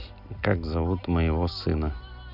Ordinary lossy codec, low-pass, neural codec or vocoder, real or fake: none; 5.4 kHz; none; real